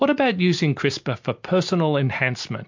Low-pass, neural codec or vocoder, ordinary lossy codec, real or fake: 7.2 kHz; none; MP3, 48 kbps; real